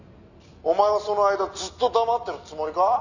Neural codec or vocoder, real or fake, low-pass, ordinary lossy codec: none; real; 7.2 kHz; none